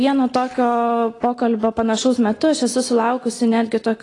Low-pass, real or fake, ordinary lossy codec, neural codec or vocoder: 10.8 kHz; real; AAC, 32 kbps; none